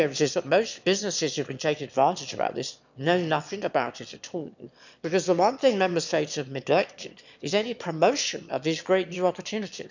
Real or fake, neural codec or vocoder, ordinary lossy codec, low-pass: fake; autoencoder, 22.05 kHz, a latent of 192 numbers a frame, VITS, trained on one speaker; none; 7.2 kHz